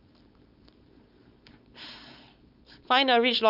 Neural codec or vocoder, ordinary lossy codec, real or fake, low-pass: codec, 16 kHz, 4.8 kbps, FACodec; none; fake; 5.4 kHz